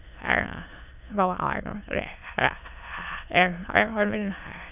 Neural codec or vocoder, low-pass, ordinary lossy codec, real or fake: autoencoder, 22.05 kHz, a latent of 192 numbers a frame, VITS, trained on many speakers; 3.6 kHz; none; fake